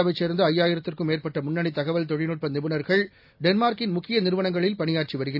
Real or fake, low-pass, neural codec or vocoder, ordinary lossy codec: real; 5.4 kHz; none; none